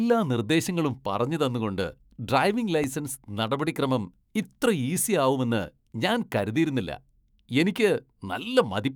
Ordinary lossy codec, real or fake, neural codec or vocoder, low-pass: none; fake; autoencoder, 48 kHz, 128 numbers a frame, DAC-VAE, trained on Japanese speech; none